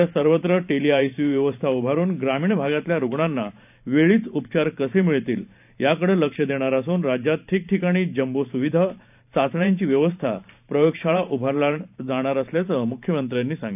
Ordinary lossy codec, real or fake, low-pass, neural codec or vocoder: none; fake; 3.6 kHz; vocoder, 44.1 kHz, 128 mel bands every 512 samples, BigVGAN v2